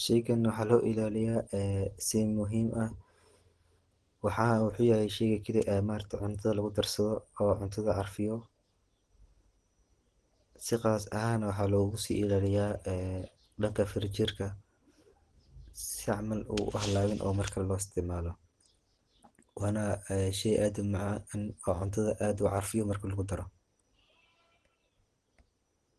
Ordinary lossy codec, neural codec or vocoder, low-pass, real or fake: Opus, 16 kbps; none; 10.8 kHz; real